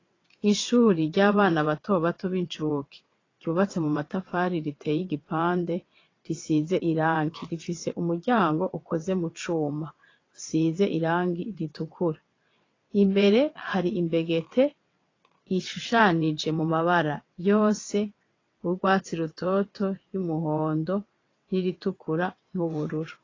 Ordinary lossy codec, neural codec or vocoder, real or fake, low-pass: AAC, 32 kbps; vocoder, 22.05 kHz, 80 mel bands, WaveNeXt; fake; 7.2 kHz